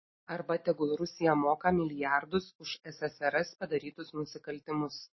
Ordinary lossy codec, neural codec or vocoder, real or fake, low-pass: MP3, 24 kbps; none; real; 7.2 kHz